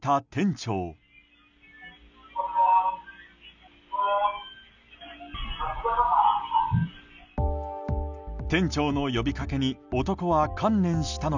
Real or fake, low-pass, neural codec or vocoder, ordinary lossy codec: real; 7.2 kHz; none; none